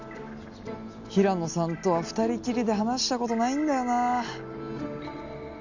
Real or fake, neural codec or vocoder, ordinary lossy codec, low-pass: real; none; none; 7.2 kHz